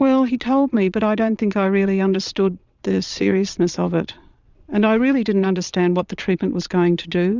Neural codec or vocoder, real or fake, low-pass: vocoder, 22.05 kHz, 80 mel bands, Vocos; fake; 7.2 kHz